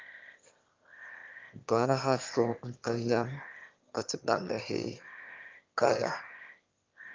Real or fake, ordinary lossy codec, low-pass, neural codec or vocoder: fake; Opus, 24 kbps; 7.2 kHz; autoencoder, 22.05 kHz, a latent of 192 numbers a frame, VITS, trained on one speaker